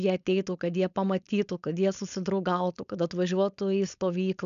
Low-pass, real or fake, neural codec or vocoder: 7.2 kHz; fake; codec, 16 kHz, 4.8 kbps, FACodec